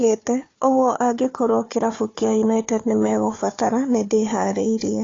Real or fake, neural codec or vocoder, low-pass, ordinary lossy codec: fake; codec, 16 kHz, 4 kbps, FunCodec, trained on Chinese and English, 50 frames a second; 7.2 kHz; AAC, 32 kbps